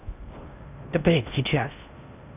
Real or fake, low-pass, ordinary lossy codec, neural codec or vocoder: fake; 3.6 kHz; none; codec, 16 kHz in and 24 kHz out, 0.6 kbps, FocalCodec, streaming, 4096 codes